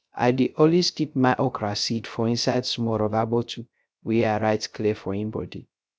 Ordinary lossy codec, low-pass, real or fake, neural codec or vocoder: none; none; fake; codec, 16 kHz, 0.3 kbps, FocalCodec